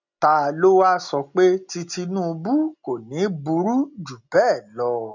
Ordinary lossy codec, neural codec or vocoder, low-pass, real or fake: none; none; 7.2 kHz; real